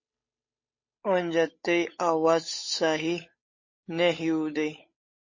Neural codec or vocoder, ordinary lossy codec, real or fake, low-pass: codec, 16 kHz, 8 kbps, FunCodec, trained on Chinese and English, 25 frames a second; MP3, 32 kbps; fake; 7.2 kHz